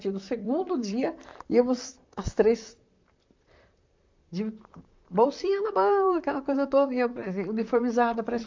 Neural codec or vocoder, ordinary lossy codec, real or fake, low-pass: vocoder, 44.1 kHz, 128 mel bands, Pupu-Vocoder; MP3, 64 kbps; fake; 7.2 kHz